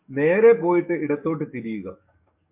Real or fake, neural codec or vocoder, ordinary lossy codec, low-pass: fake; codec, 44.1 kHz, 7.8 kbps, DAC; MP3, 32 kbps; 3.6 kHz